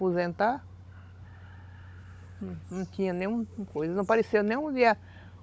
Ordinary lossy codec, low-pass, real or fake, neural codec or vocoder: none; none; fake; codec, 16 kHz, 16 kbps, FunCodec, trained on Chinese and English, 50 frames a second